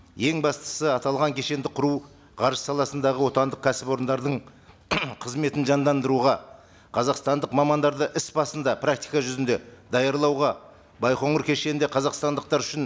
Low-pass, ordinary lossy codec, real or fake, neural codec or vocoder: none; none; real; none